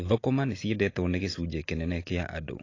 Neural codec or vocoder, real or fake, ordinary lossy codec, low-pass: none; real; AAC, 32 kbps; 7.2 kHz